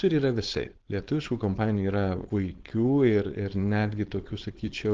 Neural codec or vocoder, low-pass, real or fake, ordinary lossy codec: codec, 16 kHz, 4.8 kbps, FACodec; 7.2 kHz; fake; Opus, 16 kbps